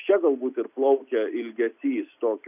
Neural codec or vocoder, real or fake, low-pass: none; real; 3.6 kHz